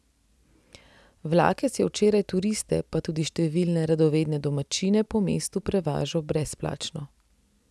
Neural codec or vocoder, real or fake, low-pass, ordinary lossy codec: none; real; none; none